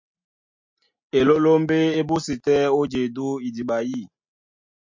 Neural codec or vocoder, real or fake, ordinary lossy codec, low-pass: none; real; MP3, 48 kbps; 7.2 kHz